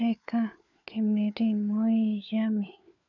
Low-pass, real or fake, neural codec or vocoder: 7.2 kHz; fake; codec, 16 kHz, 4 kbps, FunCodec, trained on LibriTTS, 50 frames a second